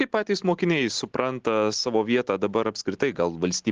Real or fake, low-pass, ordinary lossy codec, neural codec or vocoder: real; 7.2 kHz; Opus, 16 kbps; none